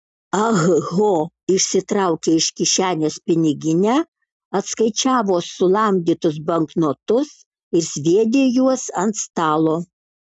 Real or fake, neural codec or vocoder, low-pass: real; none; 10.8 kHz